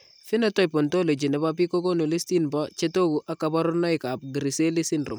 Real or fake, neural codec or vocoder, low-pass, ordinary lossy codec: real; none; none; none